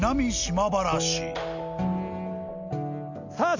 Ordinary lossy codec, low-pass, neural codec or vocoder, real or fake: none; 7.2 kHz; none; real